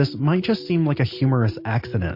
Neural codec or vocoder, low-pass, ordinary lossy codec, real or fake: none; 5.4 kHz; MP3, 32 kbps; real